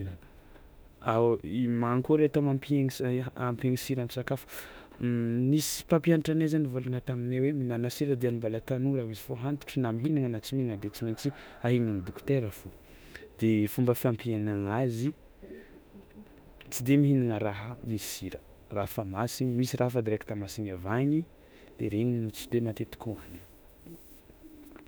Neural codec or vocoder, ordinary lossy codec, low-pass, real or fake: autoencoder, 48 kHz, 32 numbers a frame, DAC-VAE, trained on Japanese speech; none; none; fake